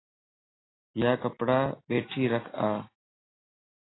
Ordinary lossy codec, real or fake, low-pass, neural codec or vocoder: AAC, 16 kbps; real; 7.2 kHz; none